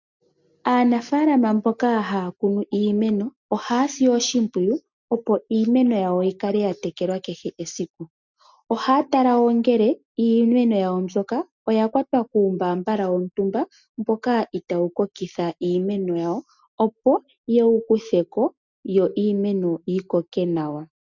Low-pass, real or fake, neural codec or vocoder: 7.2 kHz; real; none